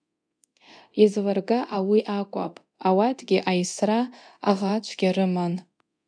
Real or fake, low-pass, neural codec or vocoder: fake; 9.9 kHz; codec, 24 kHz, 0.9 kbps, DualCodec